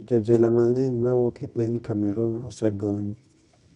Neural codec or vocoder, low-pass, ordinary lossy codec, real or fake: codec, 24 kHz, 0.9 kbps, WavTokenizer, medium music audio release; 10.8 kHz; none; fake